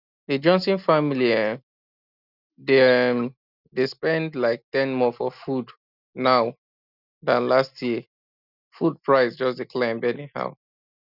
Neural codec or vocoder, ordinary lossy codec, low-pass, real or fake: none; none; 5.4 kHz; real